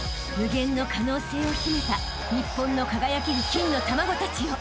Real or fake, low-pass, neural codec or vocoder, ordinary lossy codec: real; none; none; none